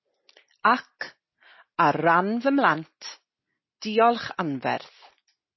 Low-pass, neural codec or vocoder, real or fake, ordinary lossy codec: 7.2 kHz; none; real; MP3, 24 kbps